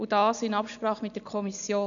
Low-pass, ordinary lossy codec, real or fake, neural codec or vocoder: 7.2 kHz; none; real; none